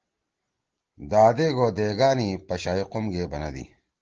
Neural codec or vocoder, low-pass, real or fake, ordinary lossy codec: none; 7.2 kHz; real; Opus, 16 kbps